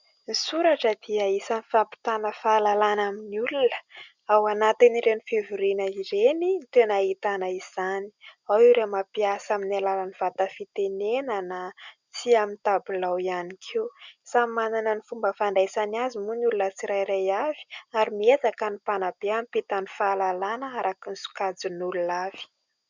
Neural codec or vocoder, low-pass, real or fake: none; 7.2 kHz; real